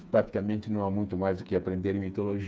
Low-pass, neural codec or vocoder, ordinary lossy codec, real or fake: none; codec, 16 kHz, 4 kbps, FreqCodec, smaller model; none; fake